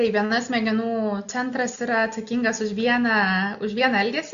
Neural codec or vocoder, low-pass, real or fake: none; 7.2 kHz; real